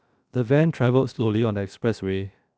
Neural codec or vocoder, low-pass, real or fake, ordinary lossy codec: codec, 16 kHz, 0.7 kbps, FocalCodec; none; fake; none